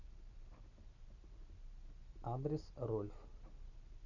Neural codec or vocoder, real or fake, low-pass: none; real; 7.2 kHz